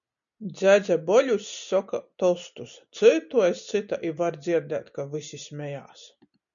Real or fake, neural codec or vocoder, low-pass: real; none; 7.2 kHz